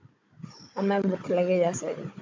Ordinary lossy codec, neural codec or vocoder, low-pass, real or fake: MP3, 64 kbps; codec, 16 kHz, 16 kbps, FunCodec, trained on Chinese and English, 50 frames a second; 7.2 kHz; fake